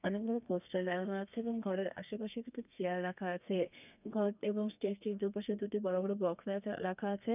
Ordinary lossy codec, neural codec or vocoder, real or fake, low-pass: none; codec, 32 kHz, 1.9 kbps, SNAC; fake; 3.6 kHz